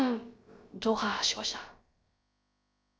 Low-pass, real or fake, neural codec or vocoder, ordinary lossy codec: none; fake; codec, 16 kHz, about 1 kbps, DyCAST, with the encoder's durations; none